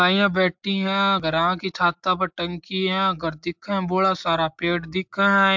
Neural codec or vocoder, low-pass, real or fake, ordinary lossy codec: none; 7.2 kHz; real; MP3, 64 kbps